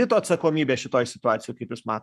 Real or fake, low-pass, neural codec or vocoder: fake; 14.4 kHz; codec, 44.1 kHz, 7.8 kbps, Pupu-Codec